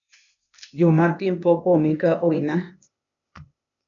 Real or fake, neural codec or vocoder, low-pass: fake; codec, 16 kHz, 0.8 kbps, ZipCodec; 7.2 kHz